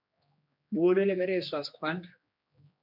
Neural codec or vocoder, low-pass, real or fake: codec, 16 kHz, 2 kbps, X-Codec, HuBERT features, trained on general audio; 5.4 kHz; fake